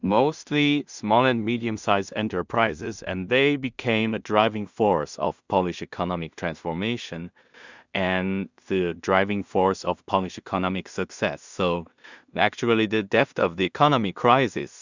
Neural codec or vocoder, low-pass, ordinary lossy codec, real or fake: codec, 16 kHz in and 24 kHz out, 0.4 kbps, LongCat-Audio-Codec, two codebook decoder; 7.2 kHz; Opus, 64 kbps; fake